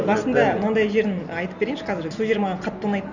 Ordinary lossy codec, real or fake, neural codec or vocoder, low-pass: none; real; none; 7.2 kHz